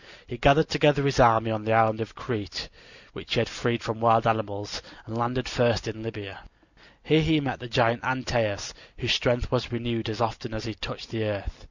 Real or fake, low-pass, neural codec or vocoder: real; 7.2 kHz; none